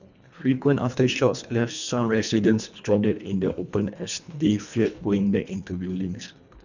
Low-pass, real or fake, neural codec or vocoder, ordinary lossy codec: 7.2 kHz; fake; codec, 24 kHz, 1.5 kbps, HILCodec; none